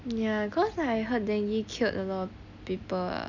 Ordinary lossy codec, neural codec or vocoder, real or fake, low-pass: none; none; real; 7.2 kHz